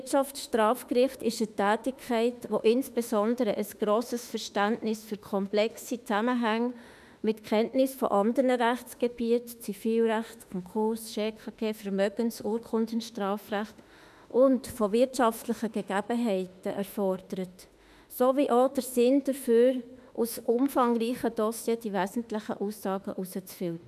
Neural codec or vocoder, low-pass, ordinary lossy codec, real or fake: autoencoder, 48 kHz, 32 numbers a frame, DAC-VAE, trained on Japanese speech; 14.4 kHz; none; fake